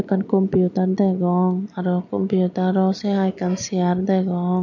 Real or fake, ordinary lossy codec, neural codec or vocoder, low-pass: real; none; none; 7.2 kHz